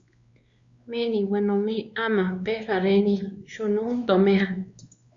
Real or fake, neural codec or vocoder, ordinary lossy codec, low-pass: fake; codec, 16 kHz, 2 kbps, X-Codec, WavLM features, trained on Multilingual LibriSpeech; Opus, 64 kbps; 7.2 kHz